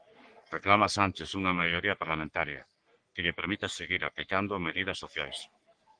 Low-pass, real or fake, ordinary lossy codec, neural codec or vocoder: 9.9 kHz; fake; Opus, 24 kbps; codec, 44.1 kHz, 3.4 kbps, Pupu-Codec